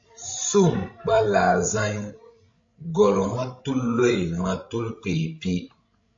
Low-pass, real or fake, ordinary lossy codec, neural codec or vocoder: 7.2 kHz; fake; MP3, 48 kbps; codec, 16 kHz, 16 kbps, FreqCodec, larger model